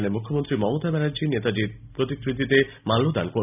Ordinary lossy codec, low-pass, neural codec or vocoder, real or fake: none; 3.6 kHz; none; real